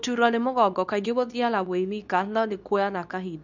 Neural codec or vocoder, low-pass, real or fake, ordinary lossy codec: codec, 24 kHz, 0.9 kbps, WavTokenizer, medium speech release version 2; 7.2 kHz; fake; none